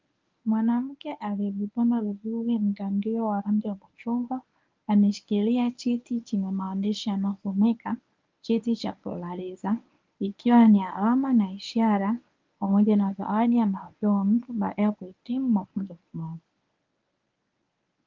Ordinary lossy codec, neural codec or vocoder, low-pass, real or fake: Opus, 24 kbps; codec, 24 kHz, 0.9 kbps, WavTokenizer, medium speech release version 1; 7.2 kHz; fake